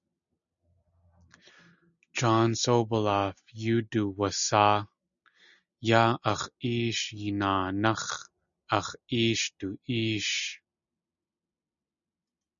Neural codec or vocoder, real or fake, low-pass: none; real; 7.2 kHz